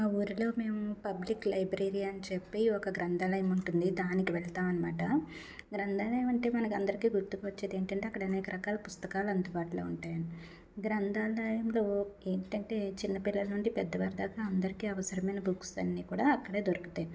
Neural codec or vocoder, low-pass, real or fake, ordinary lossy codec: none; none; real; none